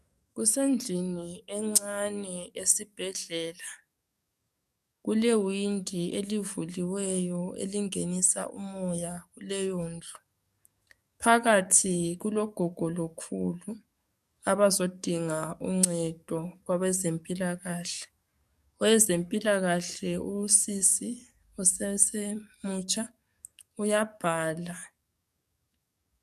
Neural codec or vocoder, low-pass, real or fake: codec, 44.1 kHz, 7.8 kbps, DAC; 14.4 kHz; fake